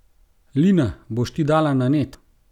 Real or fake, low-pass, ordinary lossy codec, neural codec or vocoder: real; 19.8 kHz; none; none